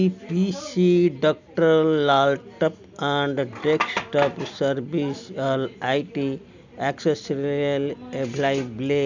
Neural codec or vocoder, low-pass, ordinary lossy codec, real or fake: none; 7.2 kHz; none; real